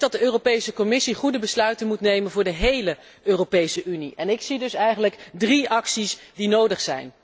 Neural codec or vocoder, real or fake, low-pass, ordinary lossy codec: none; real; none; none